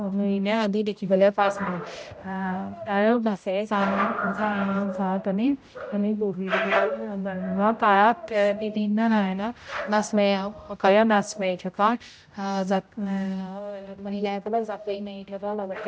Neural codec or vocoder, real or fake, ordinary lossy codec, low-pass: codec, 16 kHz, 0.5 kbps, X-Codec, HuBERT features, trained on general audio; fake; none; none